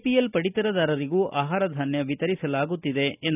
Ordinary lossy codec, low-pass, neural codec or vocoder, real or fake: none; 3.6 kHz; none; real